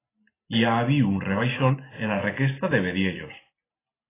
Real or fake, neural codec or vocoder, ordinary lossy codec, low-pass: real; none; AAC, 16 kbps; 3.6 kHz